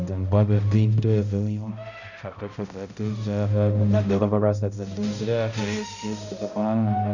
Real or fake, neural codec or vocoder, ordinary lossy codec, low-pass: fake; codec, 16 kHz, 0.5 kbps, X-Codec, HuBERT features, trained on balanced general audio; none; 7.2 kHz